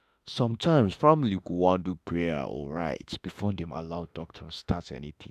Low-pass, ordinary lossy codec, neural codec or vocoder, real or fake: 14.4 kHz; AAC, 96 kbps; autoencoder, 48 kHz, 32 numbers a frame, DAC-VAE, trained on Japanese speech; fake